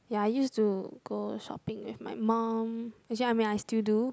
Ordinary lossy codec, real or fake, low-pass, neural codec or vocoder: none; real; none; none